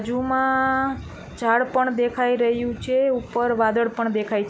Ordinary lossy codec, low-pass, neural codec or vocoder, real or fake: none; none; none; real